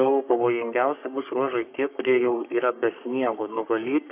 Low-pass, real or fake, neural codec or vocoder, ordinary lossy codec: 3.6 kHz; fake; codec, 44.1 kHz, 3.4 kbps, Pupu-Codec; AAC, 32 kbps